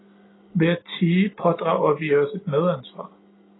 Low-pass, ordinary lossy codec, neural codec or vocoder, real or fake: 7.2 kHz; AAC, 16 kbps; none; real